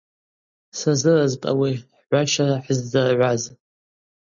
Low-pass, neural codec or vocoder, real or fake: 7.2 kHz; none; real